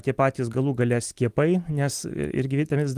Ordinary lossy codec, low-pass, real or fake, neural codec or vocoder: Opus, 24 kbps; 14.4 kHz; real; none